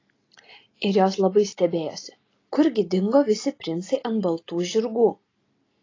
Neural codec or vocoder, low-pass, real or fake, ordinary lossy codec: none; 7.2 kHz; real; AAC, 32 kbps